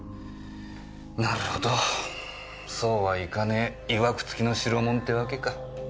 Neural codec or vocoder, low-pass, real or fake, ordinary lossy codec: none; none; real; none